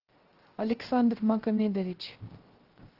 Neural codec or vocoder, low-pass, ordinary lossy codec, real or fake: codec, 16 kHz, 0.3 kbps, FocalCodec; 5.4 kHz; Opus, 16 kbps; fake